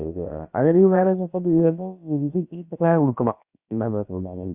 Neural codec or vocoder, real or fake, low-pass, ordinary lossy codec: codec, 16 kHz, about 1 kbps, DyCAST, with the encoder's durations; fake; 3.6 kHz; Opus, 64 kbps